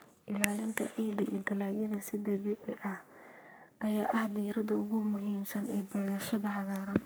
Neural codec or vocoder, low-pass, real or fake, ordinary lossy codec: codec, 44.1 kHz, 3.4 kbps, Pupu-Codec; none; fake; none